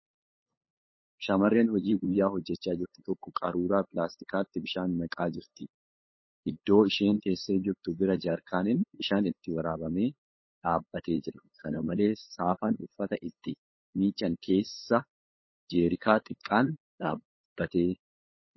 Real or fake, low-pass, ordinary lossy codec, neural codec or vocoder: fake; 7.2 kHz; MP3, 24 kbps; codec, 16 kHz, 8 kbps, FunCodec, trained on LibriTTS, 25 frames a second